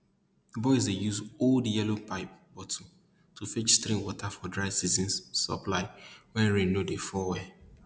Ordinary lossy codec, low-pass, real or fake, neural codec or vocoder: none; none; real; none